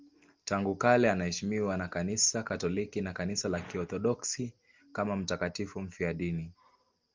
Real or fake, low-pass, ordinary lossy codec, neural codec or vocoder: real; 7.2 kHz; Opus, 32 kbps; none